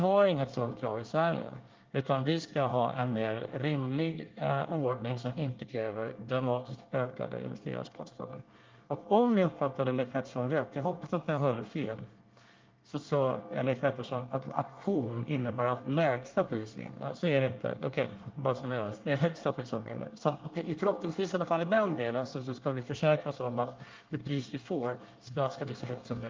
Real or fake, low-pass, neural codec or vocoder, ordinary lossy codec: fake; 7.2 kHz; codec, 24 kHz, 1 kbps, SNAC; Opus, 32 kbps